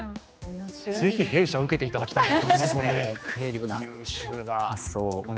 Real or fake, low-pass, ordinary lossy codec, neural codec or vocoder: fake; none; none; codec, 16 kHz, 2 kbps, X-Codec, HuBERT features, trained on general audio